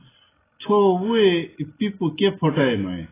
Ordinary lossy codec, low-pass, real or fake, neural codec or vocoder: AAC, 16 kbps; 3.6 kHz; real; none